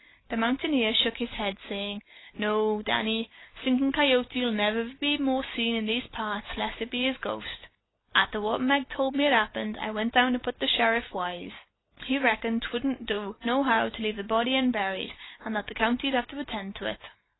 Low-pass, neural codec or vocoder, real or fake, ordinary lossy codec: 7.2 kHz; none; real; AAC, 16 kbps